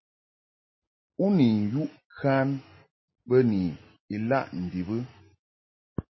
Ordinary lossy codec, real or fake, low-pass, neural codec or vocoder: MP3, 24 kbps; real; 7.2 kHz; none